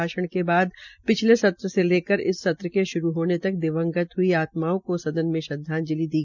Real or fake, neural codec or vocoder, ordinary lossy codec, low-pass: real; none; none; 7.2 kHz